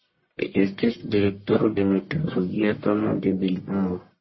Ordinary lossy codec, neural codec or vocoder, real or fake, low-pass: MP3, 24 kbps; codec, 44.1 kHz, 1.7 kbps, Pupu-Codec; fake; 7.2 kHz